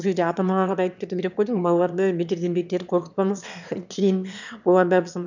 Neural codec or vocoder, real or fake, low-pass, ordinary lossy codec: autoencoder, 22.05 kHz, a latent of 192 numbers a frame, VITS, trained on one speaker; fake; 7.2 kHz; none